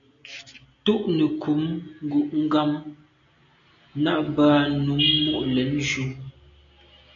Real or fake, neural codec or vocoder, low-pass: real; none; 7.2 kHz